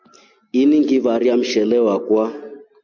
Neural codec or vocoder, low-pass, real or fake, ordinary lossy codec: none; 7.2 kHz; real; MP3, 48 kbps